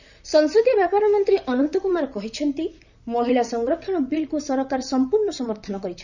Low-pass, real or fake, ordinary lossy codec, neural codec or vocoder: 7.2 kHz; fake; none; vocoder, 44.1 kHz, 128 mel bands, Pupu-Vocoder